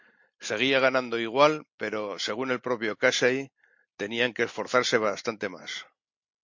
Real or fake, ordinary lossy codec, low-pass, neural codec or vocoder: real; MP3, 48 kbps; 7.2 kHz; none